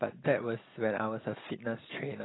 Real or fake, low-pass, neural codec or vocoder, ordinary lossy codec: real; 7.2 kHz; none; AAC, 16 kbps